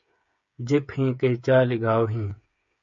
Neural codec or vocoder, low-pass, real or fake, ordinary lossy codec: codec, 16 kHz, 8 kbps, FreqCodec, smaller model; 7.2 kHz; fake; MP3, 48 kbps